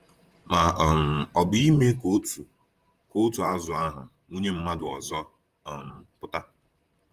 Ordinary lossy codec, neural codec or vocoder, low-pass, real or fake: Opus, 32 kbps; vocoder, 44.1 kHz, 128 mel bands, Pupu-Vocoder; 14.4 kHz; fake